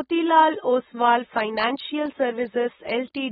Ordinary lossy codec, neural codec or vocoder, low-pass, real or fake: AAC, 16 kbps; none; 19.8 kHz; real